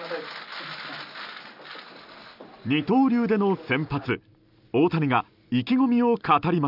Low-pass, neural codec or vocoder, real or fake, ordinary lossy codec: 5.4 kHz; none; real; none